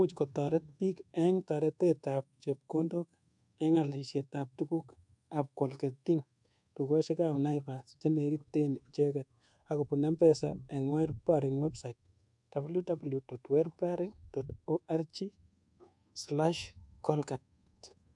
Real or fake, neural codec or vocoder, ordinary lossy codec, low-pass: fake; codec, 24 kHz, 1.2 kbps, DualCodec; none; 10.8 kHz